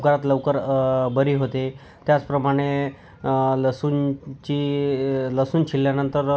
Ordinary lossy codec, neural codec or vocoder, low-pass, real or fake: none; none; none; real